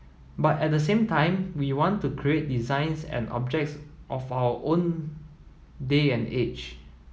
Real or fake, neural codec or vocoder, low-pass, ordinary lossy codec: real; none; none; none